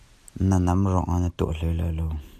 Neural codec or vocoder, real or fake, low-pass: none; real; 14.4 kHz